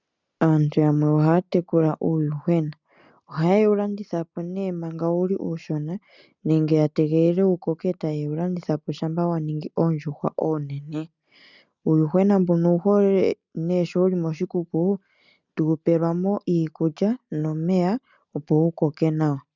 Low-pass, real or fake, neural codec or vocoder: 7.2 kHz; real; none